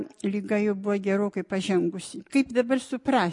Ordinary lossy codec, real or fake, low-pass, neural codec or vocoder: MP3, 48 kbps; real; 10.8 kHz; none